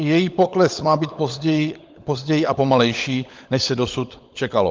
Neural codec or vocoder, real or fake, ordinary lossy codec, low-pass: codec, 16 kHz, 16 kbps, FunCodec, trained on LibriTTS, 50 frames a second; fake; Opus, 24 kbps; 7.2 kHz